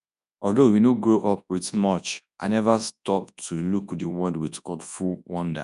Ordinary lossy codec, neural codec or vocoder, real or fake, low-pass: none; codec, 24 kHz, 0.9 kbps, WavTokenizer, large speech release; fake; 10.8 kHz